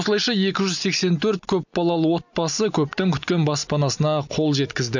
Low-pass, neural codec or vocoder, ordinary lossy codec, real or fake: 7.2 kHz; none; none; real